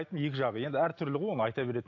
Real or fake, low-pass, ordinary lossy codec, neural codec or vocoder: real; 7.2 kHz; AAC, 48 kbps; none